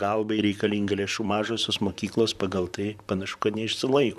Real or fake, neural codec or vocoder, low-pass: fake; vocoder, 44.1 kHz, 128 mel bands, Pupu-Vocoder; 14.4 kHz